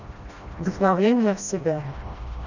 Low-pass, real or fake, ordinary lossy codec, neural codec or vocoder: 7.2 kHz; fake; none; codec, 16 kHz, 1 kbps, FreqCodec, smaller model